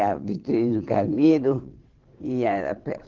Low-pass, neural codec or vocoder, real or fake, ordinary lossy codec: 7.2 kHz; vocoder, 22.05 kHz, 80 mel bands, WaveNeXt; fake; Opus, 16 kbps